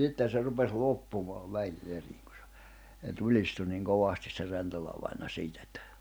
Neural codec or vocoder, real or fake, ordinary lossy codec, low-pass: none; real; none; none